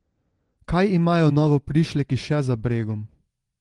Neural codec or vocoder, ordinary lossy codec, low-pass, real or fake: vocoder, 24 kHz, 100 mel bands, Vocos; Opus, 24 kbps; 10.8 kHz; fake